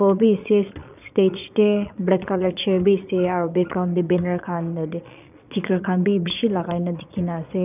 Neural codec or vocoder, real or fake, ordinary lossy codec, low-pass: vocoder, 22.05 kHz, 80 mel bands, Vocos; fake; none; 3.6 kHz